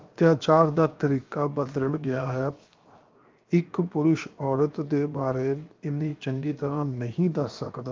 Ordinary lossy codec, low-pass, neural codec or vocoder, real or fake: Opus, 24 kbps; 7.2 kHz; codec, 16 kHz, 0.7 kbps, FocalCodec; fake